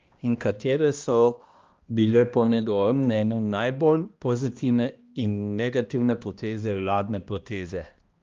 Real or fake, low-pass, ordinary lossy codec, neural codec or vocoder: fake; 7.2 kHz; Opus, 32 kbps; codec, 16 kHz, 1 kbps, X-Codec, HuBERT features, trained on balanced general audio